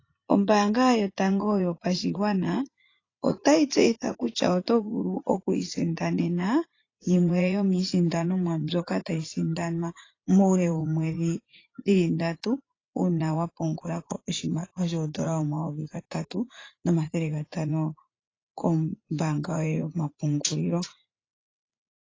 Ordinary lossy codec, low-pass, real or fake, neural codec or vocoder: AAC, 32 kbps; 7.2 kHz; fake; vocoder, 44.1 kHz, 80 mel bands, Vocos